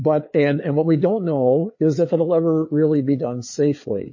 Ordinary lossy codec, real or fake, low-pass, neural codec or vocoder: MP3, 32 kbps; fake; 7.2 kHz; codec, 16 kHz, 4 kbps, FreqCodec, larger model